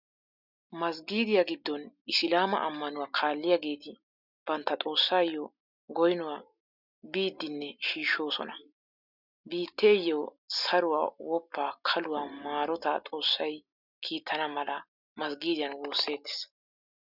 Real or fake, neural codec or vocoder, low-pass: real; none; 5.4 kHz